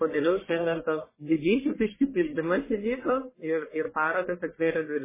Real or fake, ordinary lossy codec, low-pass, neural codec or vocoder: fake; MP3, 16 kbps; 3.6 kHz; codec, 44.1 kHz, 1.7 kbps, Pupu-Codec